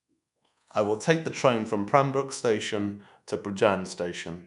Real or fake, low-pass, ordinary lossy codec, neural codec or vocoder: fake; 10.8 kHz; none; codec, 24 kHz, 1.2 kbps, DualCodec